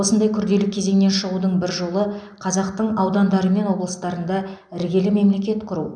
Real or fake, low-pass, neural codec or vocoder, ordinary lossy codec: real; 9.9 kHz; none; none